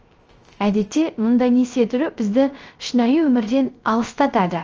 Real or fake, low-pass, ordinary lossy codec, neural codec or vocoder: fake; 7.2 kHz; Opus, 24 kbps; codec, 16 kHz, 0.3 kbps, FocalCodec